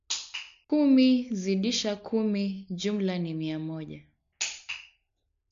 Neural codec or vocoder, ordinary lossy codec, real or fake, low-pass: none; AAC, 64 kbps; real; 7.2 kHz